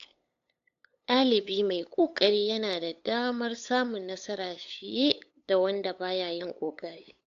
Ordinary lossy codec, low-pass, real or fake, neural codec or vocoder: AAC, 64 kbps; 7.2 kHz; fake; codec, 16 kHz, 8 kbps, FunCodec, trained on LibriTTS, 25 frames a second